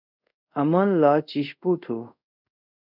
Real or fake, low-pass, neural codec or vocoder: fake; 5.4 kHz; codec, 24 kHz, 0.5 kbps, DualCodec